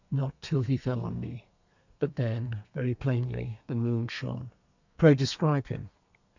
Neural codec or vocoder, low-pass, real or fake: codec, 32 kHz, 1.9 kbps, SNAC; 7.2 kHz; fake